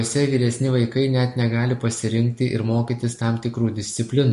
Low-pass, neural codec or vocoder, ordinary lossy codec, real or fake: 14.4 kHz; none; MP3, 48 kbps; real